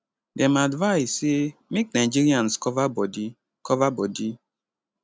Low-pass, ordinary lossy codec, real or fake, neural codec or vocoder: none; none; real; none